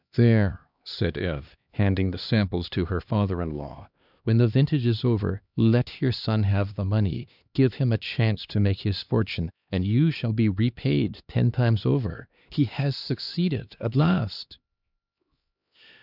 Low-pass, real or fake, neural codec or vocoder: 5.4 kHz; fake; codec, 16 kHz, 2 kbps, X-Codec, HuBERT features, trained on LibriSpeech